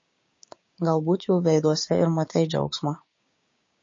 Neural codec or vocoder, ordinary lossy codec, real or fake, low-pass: codec, 16 kHz, 6 kbps, DAC; MP3, 32 kbps; fake; 7.2 kHz